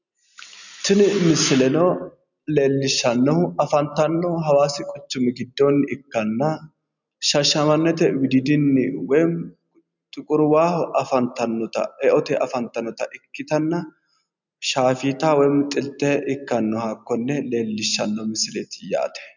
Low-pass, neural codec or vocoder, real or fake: 7.2 kHz; none; real